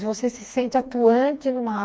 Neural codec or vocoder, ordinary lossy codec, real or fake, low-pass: codec, 16 kHz, 2 kbps, FreqCodec, smaller model; none; fake; none